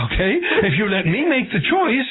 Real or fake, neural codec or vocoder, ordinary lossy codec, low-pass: real; none; AAC, 16 kbps; 7.2 kHz